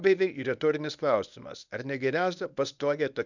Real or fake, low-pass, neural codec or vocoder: fake; 7.2 kHz; codec, 24 kHz, 0.9 kbps, WavTokenizer, medium speech release version 1